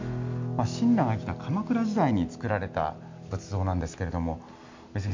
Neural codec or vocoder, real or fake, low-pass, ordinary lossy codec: none; real; 7.2 kHz; MP3, 64 kbps